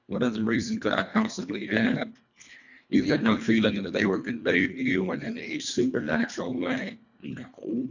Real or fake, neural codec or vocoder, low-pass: fake; codec, 24 kHz, 1.5 kbps, HILCodec; 7.2 kHz